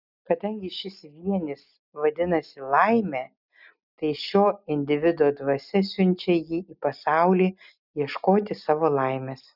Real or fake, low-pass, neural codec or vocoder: real; 5.4 kHz; none